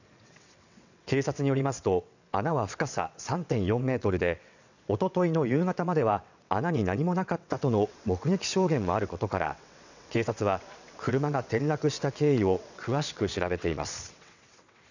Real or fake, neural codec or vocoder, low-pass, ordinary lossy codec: fake; vocoder, 22.05 kHz, 80 mel bands, WaveNeXt; 7.2 kHz; none